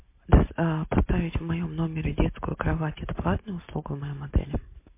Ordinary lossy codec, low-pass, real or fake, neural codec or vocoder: MP3, 24 kbps; 3.6 kHz; real; none